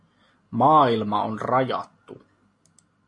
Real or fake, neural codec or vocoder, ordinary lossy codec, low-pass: real; none; AAC, 48 kbps; 10.8 kHz